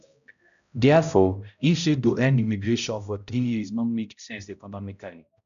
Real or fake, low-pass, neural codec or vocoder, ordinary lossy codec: fake; 7.2 kHz; codec, 16 kHz, 0.5 kbps, X-Codec, HuBERT features, trained on balanced general audio; none